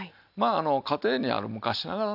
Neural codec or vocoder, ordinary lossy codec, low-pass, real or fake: none; none; 5.4 kHz; real